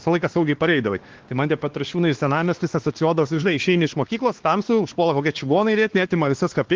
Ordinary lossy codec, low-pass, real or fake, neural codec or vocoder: Opus, 16 kbps; 7.2 kHz; fake; codec, 16 kHz, 2 kbps, X-Codec, WavLM features, trained on Multilingual LibriSpeech